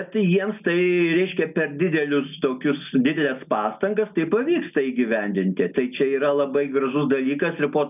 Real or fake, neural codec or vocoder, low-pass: real; none; 3.6 kHz